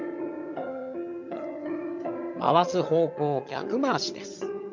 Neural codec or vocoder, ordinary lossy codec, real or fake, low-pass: vocoder, 22.05 kHz, 80 mel bands, HiFi-GAN; MP3, 48 kbps; fake; 7.2 kHz